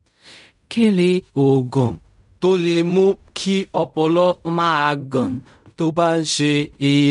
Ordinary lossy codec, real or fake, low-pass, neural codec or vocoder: none; fake; 10.8 kHz; codec, 16 kHz in and 24 kHz out, 0.4 kbps, LongCat-Audio-Codec, fine tuned four codebook decoder